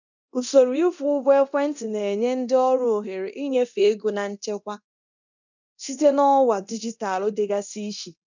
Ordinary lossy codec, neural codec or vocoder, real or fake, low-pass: none; codec, 24 kHz, 0.9 kbps, DualCodec; fake; 7.2 kHz